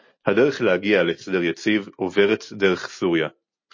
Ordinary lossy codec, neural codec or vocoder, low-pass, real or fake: MP3, 32 kbps; none; 7.2 kHz; real